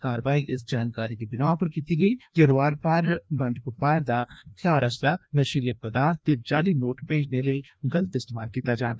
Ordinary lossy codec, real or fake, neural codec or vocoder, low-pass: none; fake; codec, 16 kHz, 1 kbps, FreqCodec, larger model; none